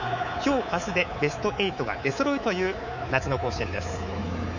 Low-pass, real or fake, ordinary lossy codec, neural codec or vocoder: 7.2 kHz; fake; none; codec, 24 kHz, 3.1 kbps, DualCodec